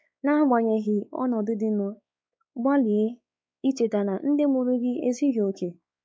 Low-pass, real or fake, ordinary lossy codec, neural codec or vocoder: none; fake; none; codec, 16 kHz, 4 kbps, X-Codec, WavLM features, trained on Multilingual LibriSpeech